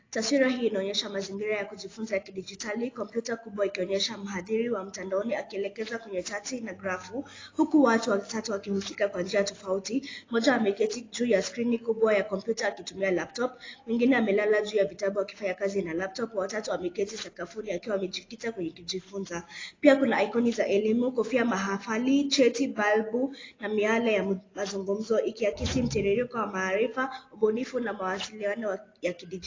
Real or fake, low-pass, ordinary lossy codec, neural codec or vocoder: real; 7.2 kHz; AAC, 32 kbps; none